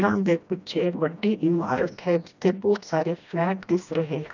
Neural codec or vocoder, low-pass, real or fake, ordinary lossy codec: codec, 16 kHz, 1 kbps, FreqCodec, smaller model; 7.2 kHz; fake; none